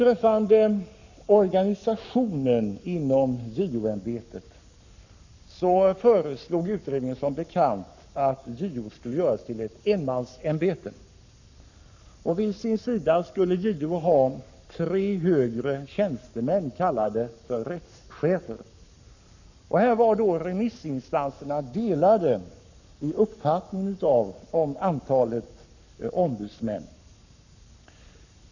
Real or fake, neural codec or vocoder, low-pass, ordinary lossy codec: fake; codec, 44.1 kHz, 7.8 kbps, Pupu-Codec; 7.2 kHz; none